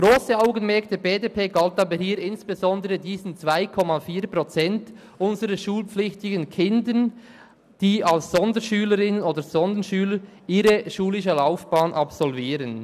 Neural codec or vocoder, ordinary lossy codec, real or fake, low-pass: none; none; real; 14.4 kHz